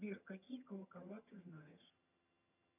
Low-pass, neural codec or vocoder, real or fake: 3.6 kHz; vocoder, 22.05 kHz, 80 mel bands, HiFi-GAN; fake